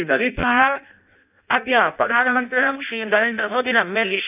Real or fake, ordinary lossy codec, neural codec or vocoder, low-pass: fake; none; codec, 16 kHz in and 24 kHz out, 0.6 kbps, FireRedTTS-2 codec; 3.6 kHz